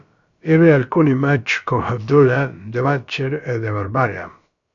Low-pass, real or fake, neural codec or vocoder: 7.2 kHz; fake; codec, 16 kHz, about 1 kbps, DyCAST, with the encoder's durations